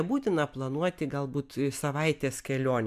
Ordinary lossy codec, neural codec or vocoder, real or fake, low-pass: MP3, 96 kbps; none; real; 14.4 kHz